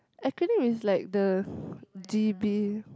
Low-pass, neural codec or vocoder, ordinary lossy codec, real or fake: none; none; none; real